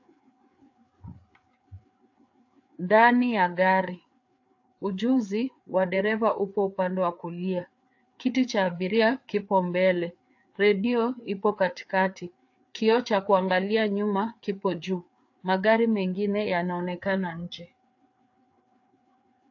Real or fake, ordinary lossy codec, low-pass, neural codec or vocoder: fake; AAC, 48 kbps; 7.2 kHz; codec, 16 kHz, 4 kbps, FreqCodec, larger model